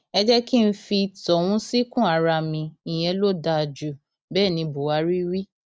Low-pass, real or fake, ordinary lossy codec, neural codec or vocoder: none; real; none; none